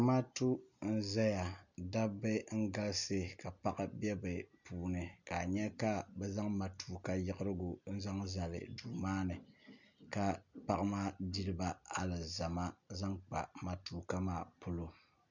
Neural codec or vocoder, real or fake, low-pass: none; real; 7.2 kHz